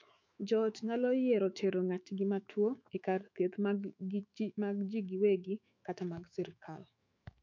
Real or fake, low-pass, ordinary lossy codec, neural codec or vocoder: fake; 7.2 kHz; MP3, 64 kbps; autoencoder, 48 kHz, 128 numbers a frame, DAC-VAE, trained on Japanese speech